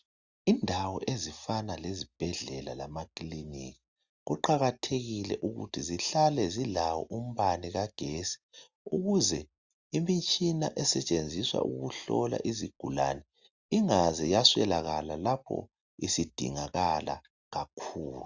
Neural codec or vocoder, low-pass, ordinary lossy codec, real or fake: none; 7.2 kHz; Opus, 64 kbps; real